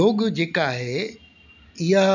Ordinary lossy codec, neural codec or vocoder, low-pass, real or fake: none; none; 7.2 kHz; real